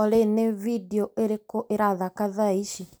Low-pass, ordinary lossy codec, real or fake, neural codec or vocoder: none; none; real; none